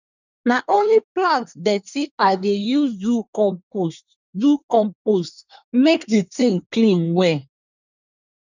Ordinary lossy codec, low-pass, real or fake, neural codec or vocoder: none; 7.2 kHz; fake; codec, 24 kHz, 1 kbps, SNAC